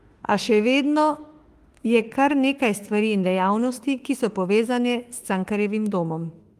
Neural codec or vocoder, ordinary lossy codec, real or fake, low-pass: autoencoder, 48 kHz, 32 numbers a frame, DAC-VAE, trained on Japanese speech; Opus, 24 kbps; fake; 14.4 kHz